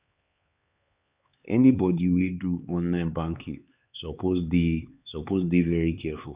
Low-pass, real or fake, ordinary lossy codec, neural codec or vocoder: 3.6 kHz; fake; Opus, 64 kbps; codec, 16 kHz, 4 kbps, X-Codec, HuBERT features, trained on LibriSpeech